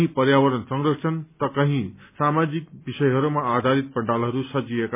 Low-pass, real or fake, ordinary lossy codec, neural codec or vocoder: 3.6 kHz; real; MP3, 32 kbps; none